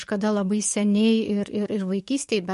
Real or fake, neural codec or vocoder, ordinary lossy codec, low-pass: fake; codec, 44.1 kHz, 7.8 kbps, DAC; MP3, 48 kbps; 14.4 kHz